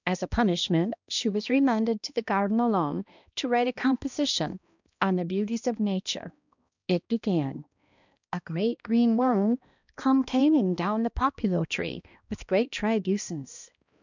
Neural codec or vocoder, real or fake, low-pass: codec, 16 kHz, 1 kbps, X-Codec, HuBERT features, trained on balanced general audio; fake; 7.2 kHz